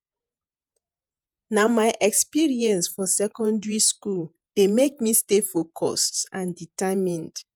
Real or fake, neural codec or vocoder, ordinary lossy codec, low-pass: fake; vocoder, 48 kHz, 128 mel bands, Vocos; none; none